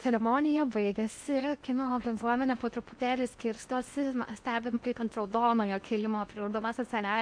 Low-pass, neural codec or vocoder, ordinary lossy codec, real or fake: 9.9 kHz; codec, 16 kHz in and 24 kHz out, 0.8 kbps, FocalCodec, streaming, 65536 codes; AAC, 64 kbps; fake